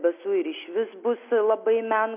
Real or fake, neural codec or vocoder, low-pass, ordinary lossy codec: real; none; 3.6 kHz; MP3, 32 kbps